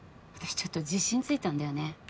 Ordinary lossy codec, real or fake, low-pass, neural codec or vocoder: none; real; none; none